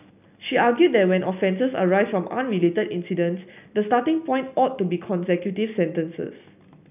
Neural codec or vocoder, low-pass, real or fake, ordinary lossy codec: none; 3.6 kHz; real; none